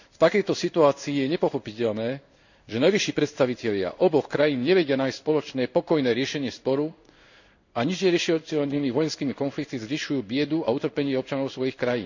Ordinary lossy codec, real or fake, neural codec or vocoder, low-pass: none; fake; codec, 16 kHz in and 24 kHz out, 1 kbps, XY-Tokenizer; 7.2 kHz